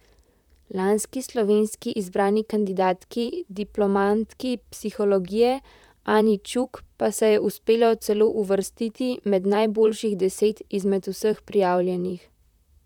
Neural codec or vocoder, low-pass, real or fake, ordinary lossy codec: vocoder, 44.1 kHz, 128 mel bands, Pupu-Vocoder; 19.8 kHz; fake; none